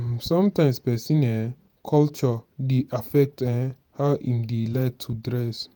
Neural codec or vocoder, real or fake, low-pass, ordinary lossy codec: vocoder, 48 kHz, 128 mel bands, Vocos; fake; none; none